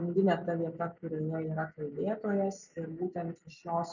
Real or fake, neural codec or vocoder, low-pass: real; none; 7.2 kHz